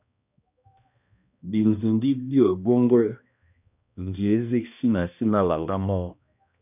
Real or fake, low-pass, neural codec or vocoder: fake; 3.6 kHz; codec, 16 kHz, 1 kbps, X-Codec, HuBERT features, trained on balanced general audio